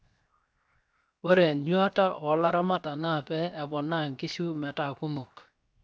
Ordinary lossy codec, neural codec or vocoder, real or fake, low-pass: none; codec, 16 kHz, 0.7 kbps, FocalCodec; fake; none